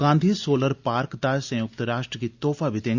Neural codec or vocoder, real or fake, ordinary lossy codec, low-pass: none; real; Opus, 64 kbps; 7.2 kHz